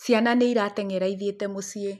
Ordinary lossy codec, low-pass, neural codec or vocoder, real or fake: MP3, 96 kbps; 14.4 kHz; none; real